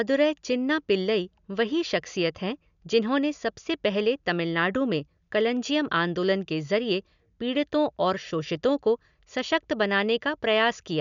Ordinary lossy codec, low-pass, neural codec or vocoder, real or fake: MP3, 96 kbps; 7.2 kHz; none; real